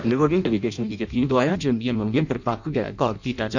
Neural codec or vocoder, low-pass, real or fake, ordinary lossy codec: codec, 16 kHz in and 24 kHz out, 0.6 kbps, FireRedTTS-2 codec; 7.2 kHz; fake; none